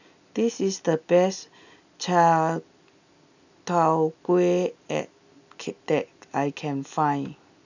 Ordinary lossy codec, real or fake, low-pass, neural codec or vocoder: none; real; 7.2 kHz; none